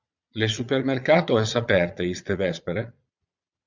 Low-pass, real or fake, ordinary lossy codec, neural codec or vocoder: 7.2 kHz; fake; Opus, 64 kbps; vocoder, 22.05 kHz, 80 mel bands, Vocos